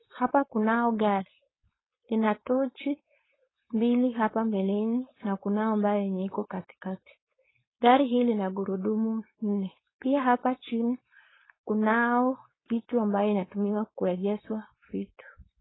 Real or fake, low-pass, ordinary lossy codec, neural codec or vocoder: fake; 7.2 kHz; AAC, 16 kbps; codec, 16 kHz, 4.8 kbps, FACodec